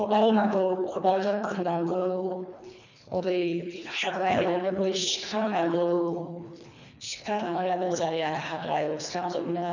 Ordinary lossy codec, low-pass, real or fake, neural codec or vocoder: none; 7.2 kHz; fake; codec, 24 kHz, 1.5 kbps, HILCodec